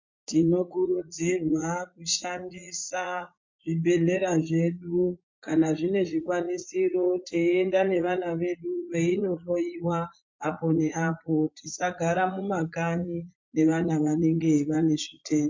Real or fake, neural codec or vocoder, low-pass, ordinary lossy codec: fake; vocoder, 44.1 kHz, 80 mel bands, Vocos; 7.2 kHz; MP3, 48 kbps